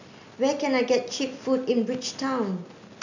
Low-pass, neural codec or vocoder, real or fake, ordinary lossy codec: 7.2 kHz; none; real; none